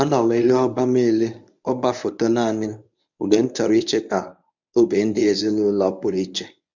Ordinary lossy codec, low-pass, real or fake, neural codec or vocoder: none; 7.2 kHz; fake; codec, 24 kHz, 0.9 kbps, WavTokenizer, medium speech release version 1